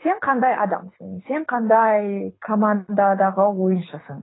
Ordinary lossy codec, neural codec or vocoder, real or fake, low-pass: AAC, 16 kbps; codec, 24 kHz, 6 kbps, HILCodec; fake; 7.2 kHz